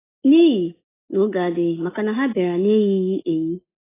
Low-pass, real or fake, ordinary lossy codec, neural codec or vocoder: 3.6 kHz; real; AAC, 16 kbps; none